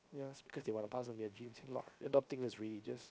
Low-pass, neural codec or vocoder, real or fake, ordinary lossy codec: none; codec, 16 kHz, 0.7 kbps, FocalCodec; fake; none